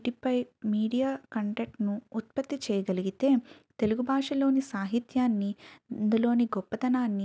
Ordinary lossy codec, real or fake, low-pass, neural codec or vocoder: none; real; none; none